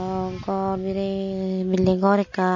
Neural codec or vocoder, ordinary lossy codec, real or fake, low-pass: none; MP3, 32 kbps; real; 7.2 kHz